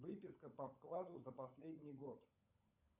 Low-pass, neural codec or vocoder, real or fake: 3.6 kHz; codec, 16 kHz, 16 kbps, FunCodec, trained on LibriTTS, 50 frames a second; fake